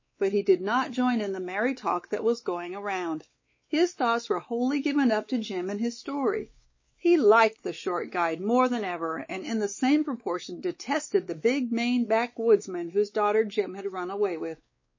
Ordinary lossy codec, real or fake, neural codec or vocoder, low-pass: MP3, 32 kbps; fake; codec, 16 kHz, 4 kbps, X-Codec, WavLM features, trained on Multilingual LibriSpeech; 7.2 kHz